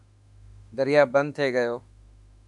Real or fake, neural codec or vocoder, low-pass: fake; autoencoder, 48 kHz, 32 numbers a frame, DAC-VAE, trained on Japanese speech; 10.8 kHz